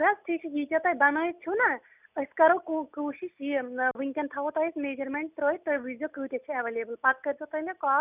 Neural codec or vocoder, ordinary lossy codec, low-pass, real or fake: none; none; 3.6 kHz; real